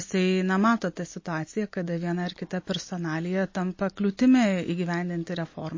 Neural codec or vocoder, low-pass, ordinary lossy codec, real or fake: none; 7.2 kHz; MP3, 32 kbps; real